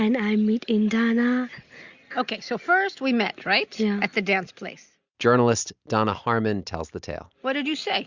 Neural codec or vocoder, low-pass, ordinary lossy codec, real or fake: none; 7.2 kHz; Opus, 64 kbps; real